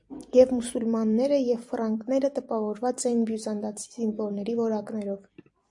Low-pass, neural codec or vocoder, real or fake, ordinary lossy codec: 10.8 kHz; vocoder, 44.1 kHz, 128 mel bands every 256 samples, BigVGAN v2; fake; AAC, 64 kbps